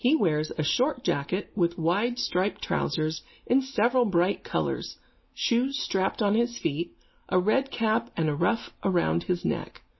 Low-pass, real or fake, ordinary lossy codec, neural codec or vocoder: 7.2 kHz; real; MP3, 24 kbps; none